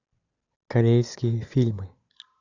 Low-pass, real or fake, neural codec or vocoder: 7.2 kHz; real; none